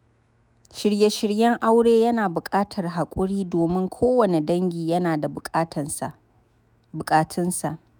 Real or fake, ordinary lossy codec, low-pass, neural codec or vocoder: fake; none; none; autoencoder, 48 kHz, 128 numbers a frame, DAC-VAE, trained on Japanese speech